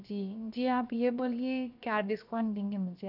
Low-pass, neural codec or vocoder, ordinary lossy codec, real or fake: 5.4 kHz; codec, 16 kHz, 0.7 kbps, FocalCodec; none; fake